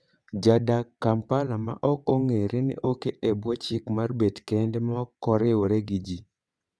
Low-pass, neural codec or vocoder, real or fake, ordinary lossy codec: none; vocoder, 22.05 kHz, 80 mel bands, WaveNeXt; fake; none